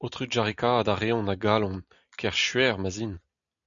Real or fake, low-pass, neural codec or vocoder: real; 7.2 kHz; none